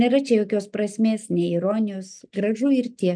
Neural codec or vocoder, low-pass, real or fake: none; 9.9 kHz; real